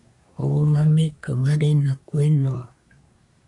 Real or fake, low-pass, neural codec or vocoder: fake; 10.8 kHz; codec, 24 kHz, 1 kbps, SNAC